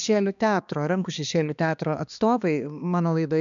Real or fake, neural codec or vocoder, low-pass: fake; codec, 16 kHz, 2 kbps, X-Codec, HuBERT features, trained on balanced general audio; 7.2 kHz